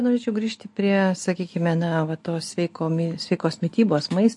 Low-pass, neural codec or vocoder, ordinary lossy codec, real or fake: 10.8 kHz; none; MP3, 48 kbps; real